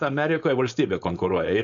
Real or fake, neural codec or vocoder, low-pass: fake; codec, 16 kHz, 4.8 kbps, FACodec; 7.2 kHz